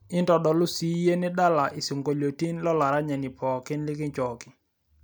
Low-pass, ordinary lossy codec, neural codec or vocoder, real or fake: none; none; none; real